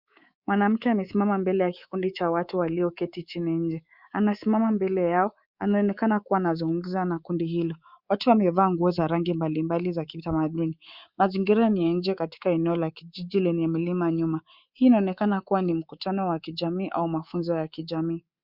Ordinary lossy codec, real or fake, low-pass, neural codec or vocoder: Opus, 64 kbps; fake; 5.4 kHz; codec, 24 kHz, 3.1 kbps, DualCodec